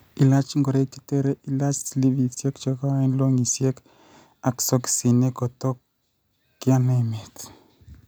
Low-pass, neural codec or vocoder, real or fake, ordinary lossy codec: none; none; real; none